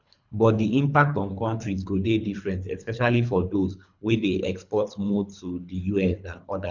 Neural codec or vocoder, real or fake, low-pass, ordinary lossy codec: codec, 24 kHz, 3 kbps, HILCodec; fake; 7.2 kHz; none